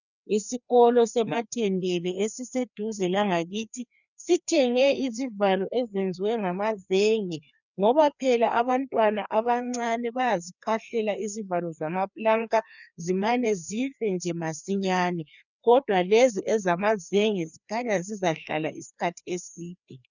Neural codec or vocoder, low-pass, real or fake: codec, 16 kHz, 2 kbps, FreqCodec, larger model; 7.2 kHz; fake